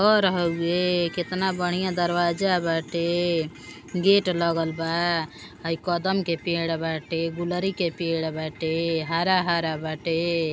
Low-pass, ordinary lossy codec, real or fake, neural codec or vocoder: none; none; real; none